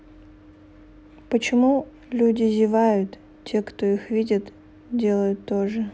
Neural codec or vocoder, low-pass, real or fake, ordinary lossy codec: none; none; real; none